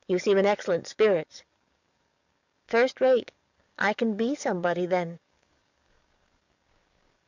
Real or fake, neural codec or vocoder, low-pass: fake; codec, 44.1 kHz, 7.8 kbps, DAC; 7.2 kHz